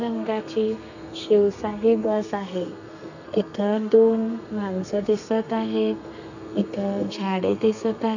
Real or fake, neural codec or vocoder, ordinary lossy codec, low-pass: fake; codec, 32 kHz, 1.9 kbps, SNAC; none; 7.2 kHz